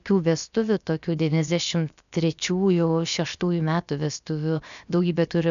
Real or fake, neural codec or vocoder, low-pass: fake; codec, 16 kHz, 0.7 kbps, FocalCodec; 7.2 kHz